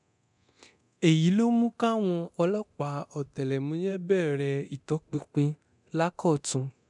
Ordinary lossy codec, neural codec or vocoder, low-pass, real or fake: none; codec, 24 kHz, 0.9 kbps, DualCodec; 10.8 kHz; fake